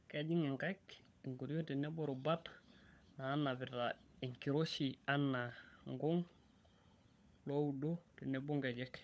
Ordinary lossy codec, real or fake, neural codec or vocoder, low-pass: none; fake; codec, 16 kHz, 16 kbps, FunCodec, trained on LibriTTS, 50 frames a second; none